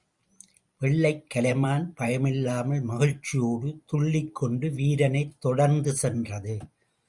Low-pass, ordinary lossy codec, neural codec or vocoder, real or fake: 10.8 kHz; Opus, 64 kbps; vocoder, 44.1 kHz, 128 mel bands every 256 samples, BigVGAN v2; fake